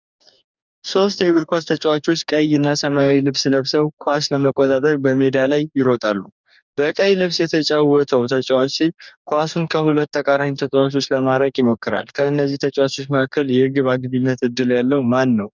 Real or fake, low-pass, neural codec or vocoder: fake; 7.2 kHz; codec, 44.1 kHz, 2.6 kbps, DAC